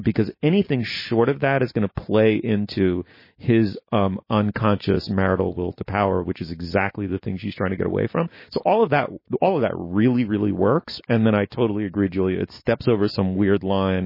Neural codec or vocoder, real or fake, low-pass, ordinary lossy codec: none; real; 5.4 kHz; MP3, 24 kbps